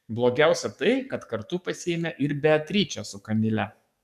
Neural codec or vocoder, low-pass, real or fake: codec, 44.1 kHz, 7.8 kbps, DAC; 14.4 kHz; fake